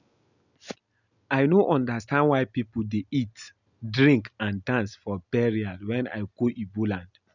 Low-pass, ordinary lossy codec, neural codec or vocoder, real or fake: 7.2 kHz; none; none; real